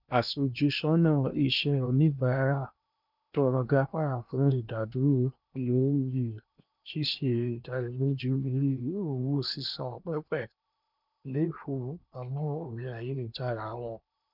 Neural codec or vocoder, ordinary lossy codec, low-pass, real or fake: codec, 16 kHz in and 24 kHz out, 0.8 kbps, FocalCodec, streaming, 65536 codes; none; 5.4 kHz; fake